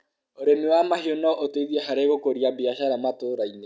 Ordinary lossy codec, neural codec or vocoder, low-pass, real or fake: none; none; none; real